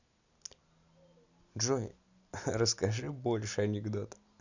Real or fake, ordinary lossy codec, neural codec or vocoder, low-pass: real; none; none; 7.2 kHz